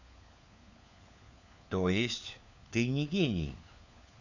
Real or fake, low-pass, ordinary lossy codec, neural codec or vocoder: fake; 7.2 kHz; none; codec, 16 kHz, 4 kbps, FunCodec, trained on LibriTTS, 50 frames a second